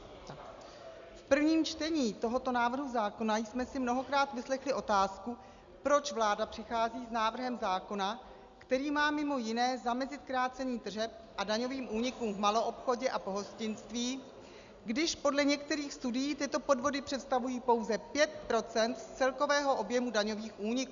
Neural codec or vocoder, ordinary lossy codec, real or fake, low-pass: none; Opus, 64 kbps; real; 7.2 kHz